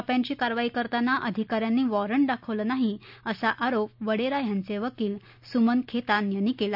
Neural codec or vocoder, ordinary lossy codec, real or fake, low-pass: none; none; real; 5.4 kHz